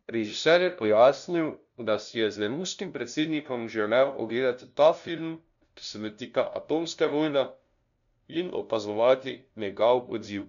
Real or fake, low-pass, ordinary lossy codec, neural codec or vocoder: fake; 7.2 kHz; none; codec, 16 kHz, 0.5 kbps, FunCodec, trained on LibriTTS, 25 frames a second